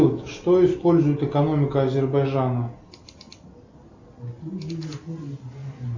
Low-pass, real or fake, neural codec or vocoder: 7.2 kHz; real; none